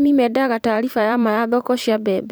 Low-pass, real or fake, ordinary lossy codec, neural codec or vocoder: none; fake; none; vocoder, 44.1 kHz, 128 mel bands every 512 samples, BigVGAN v2